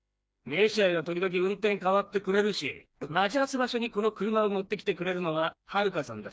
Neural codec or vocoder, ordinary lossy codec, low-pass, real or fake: codec, 16 kHz, 2 kbps, FreqCodec, smaller model; none; none; fake